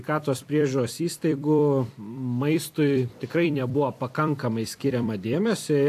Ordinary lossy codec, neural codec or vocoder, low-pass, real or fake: AAC, 64 kbps; vocoder, 44.1 kHz, 128 mel bands every 256 samples, BigVGAN v2; 14.4 kHz; fake